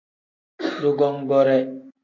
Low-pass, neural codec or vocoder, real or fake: 7.2 kHz; none; real